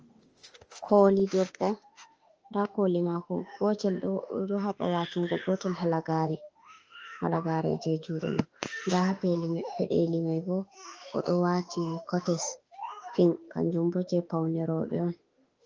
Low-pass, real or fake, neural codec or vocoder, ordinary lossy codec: 7.2 kHz; fake; autoencoder, 48 kHz, 32 numbers a frame, DAC-VAE, trained on Japanese speech; Opus, 24 kbps